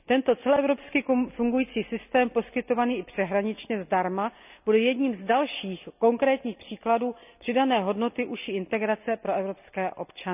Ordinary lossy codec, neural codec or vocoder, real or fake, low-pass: none; none; real; 3.6 kHz